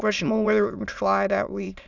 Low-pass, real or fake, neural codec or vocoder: 7.2 kHz; fake; autoencoder, 22.05 kHz, a latent of 192 numbers a frame, VITS, trained on many speakers